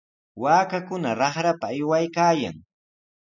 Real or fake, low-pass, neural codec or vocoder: real; 7.2 kHz; none